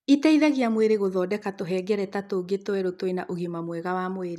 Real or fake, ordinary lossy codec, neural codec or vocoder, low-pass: real; none; none; 14.4 kHz